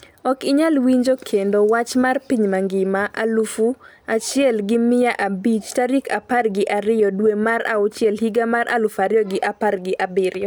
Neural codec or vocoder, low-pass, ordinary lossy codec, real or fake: none; none; none; real